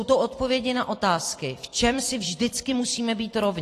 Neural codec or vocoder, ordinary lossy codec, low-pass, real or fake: vocoder, 44.1 kHz, 128 mel bands every 256 samples, BigVGAN v2; AAC, 48 kbps; 14.4 kHz; fake